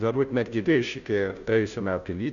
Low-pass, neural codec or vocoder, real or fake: 7.2 kHz; codec, 16 kHz, 0.5 kbps, FunCodec, trained on Chinese and English, 25 frames a second; fake